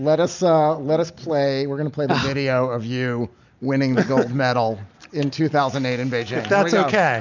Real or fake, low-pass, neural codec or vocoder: real; 7.2 kHz; none